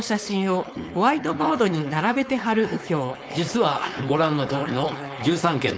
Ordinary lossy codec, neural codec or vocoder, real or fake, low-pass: none; codec, 16 kHz, 4.8 kbps, FACodec; fake; none